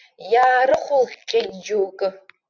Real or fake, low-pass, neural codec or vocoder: real; 7.2 kHz; none